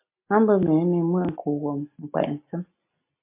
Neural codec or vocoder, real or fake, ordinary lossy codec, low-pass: none; real; MP3, 24 kbps; 3.6 kHz